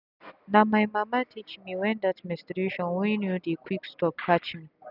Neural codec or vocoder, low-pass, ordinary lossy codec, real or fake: none; 5.4 kHz; none; real